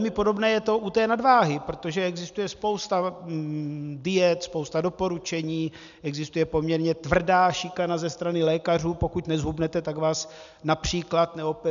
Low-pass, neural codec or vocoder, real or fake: 7.2 kHz; none; real